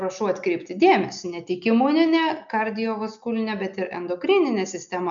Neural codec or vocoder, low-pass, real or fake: none; 7.2 kHz; real